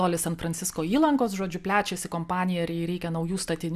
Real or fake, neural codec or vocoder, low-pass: real; none; 14.4 kHz